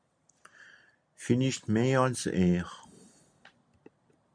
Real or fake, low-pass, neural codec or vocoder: real; 9.9 kHz; none